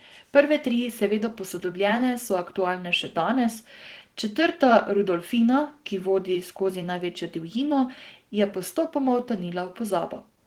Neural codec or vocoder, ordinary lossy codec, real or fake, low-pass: vocoder, 44.1 kHz, 128 mel bands every 512 samples, BigVGAN v2; Opus, 16 kbps; fake; 19.8 kHz